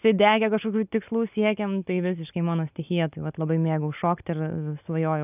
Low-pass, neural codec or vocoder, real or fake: 3.6 kHz; none; real